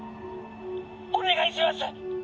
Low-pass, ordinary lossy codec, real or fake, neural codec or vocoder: none; none; real; none